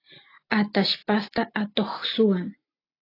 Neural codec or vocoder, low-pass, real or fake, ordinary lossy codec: none; 5.4 kHz; real; AAC, 24 kbps